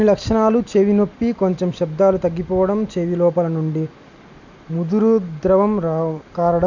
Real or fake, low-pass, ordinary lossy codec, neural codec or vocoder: real; 7.2 kHz; none; none